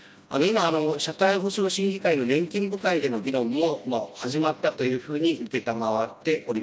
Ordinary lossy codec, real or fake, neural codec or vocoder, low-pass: none; fake; codec, 16 kHz, 1 kbps, FreqCodec, smaller model; none